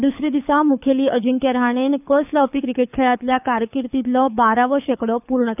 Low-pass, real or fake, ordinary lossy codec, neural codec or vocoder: 3.6 kHz; fake; none; codec, 24 kHz, 6 kbps, HILCodec